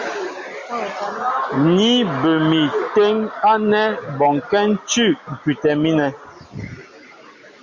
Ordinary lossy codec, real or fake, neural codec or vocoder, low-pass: Opus, 64 kbps; real; none; 7.2 kHz